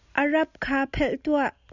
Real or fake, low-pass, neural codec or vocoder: real; 7.2 kHz; none